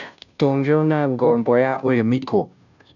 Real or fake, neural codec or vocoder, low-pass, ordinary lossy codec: fake; codec, 16 kHz, 0.5 kbps, FunCodec, trained on Chinese and English, 25 frames a second; 7.2 kHz; none